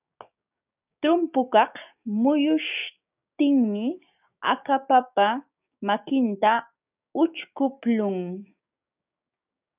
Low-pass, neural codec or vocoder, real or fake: 3.6 kHz; codec, 16 kHz, 6 kbps, DAC; fake